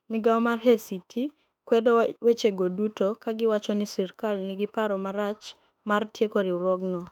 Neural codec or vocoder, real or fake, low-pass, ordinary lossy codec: autoencoder, 48 kHz, 32 numbers a frame, DAC-VAE, trained on Japanese speech; fake; 19.8 kHz; none